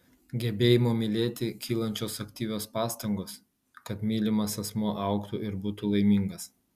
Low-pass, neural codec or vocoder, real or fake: 14.4 kHz; none; real